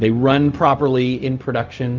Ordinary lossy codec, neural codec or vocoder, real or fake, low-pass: Opus, 24 kbps; codec, 16 kHz, 0.4 kbps, LongCat-Audio-Codec; fake; 7.2 kHz